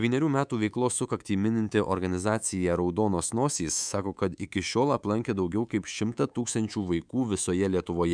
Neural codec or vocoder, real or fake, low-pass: codec, 24 kHz, 3.1 kbps, DualCodec; fake; 9.9 kHz